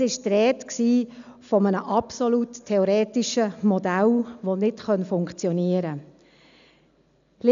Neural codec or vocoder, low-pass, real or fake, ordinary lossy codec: none; 7.2 kHz; real; none